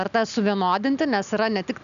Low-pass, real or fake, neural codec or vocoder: 7.2 kHz; real; none